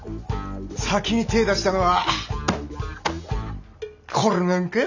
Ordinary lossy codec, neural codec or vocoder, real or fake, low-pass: none; none; real; 7.2 kHz